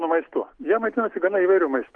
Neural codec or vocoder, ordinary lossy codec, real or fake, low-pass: none; Opus, 24 kbps; real; 9.9 kHz